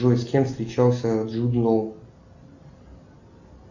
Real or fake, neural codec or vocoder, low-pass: real; none; 7.2 kHz